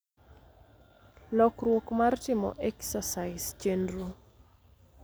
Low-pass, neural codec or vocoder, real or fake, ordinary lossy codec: none; none; real; none